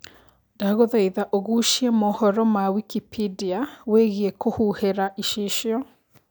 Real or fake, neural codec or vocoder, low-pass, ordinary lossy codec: real; none; none; none